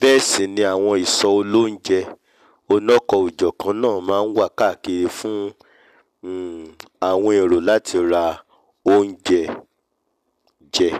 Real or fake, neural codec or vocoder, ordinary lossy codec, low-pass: real; none; none; 14.4 kHz